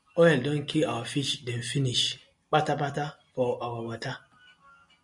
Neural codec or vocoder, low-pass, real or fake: none; 10.8 kHz; real